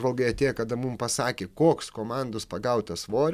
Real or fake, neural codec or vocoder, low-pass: fake; vocoder, 44.1 kHz, 128 mel bands every 256 samples, BigVGAN v2; 14.4 kHz